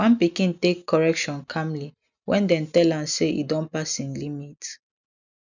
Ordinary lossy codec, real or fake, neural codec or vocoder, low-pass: none; real; none; 7.2 kHz